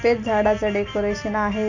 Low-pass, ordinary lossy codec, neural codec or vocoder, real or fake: 7.2 kHz; none; none; real